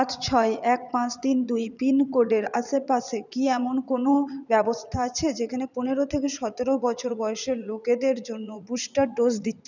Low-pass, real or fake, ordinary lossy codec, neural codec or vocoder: 7.2 kHz; fake; none; vocoder, 44.1 kHz, 80 mel bands, Vocos